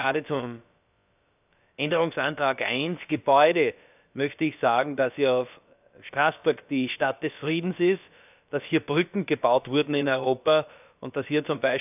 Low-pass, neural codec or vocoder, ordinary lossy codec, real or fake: 3.6 kHz; codec, 16 kHz, about 1 kbps, DyCAST, with the encoder's durations; none; fake